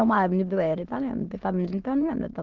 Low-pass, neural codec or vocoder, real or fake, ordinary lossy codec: 7.2 kHz; autoencoder, 22.05 kHz, a latent of 192 numbers a frame, VITS, trained on many speakers; fake; Opus, 16 kbps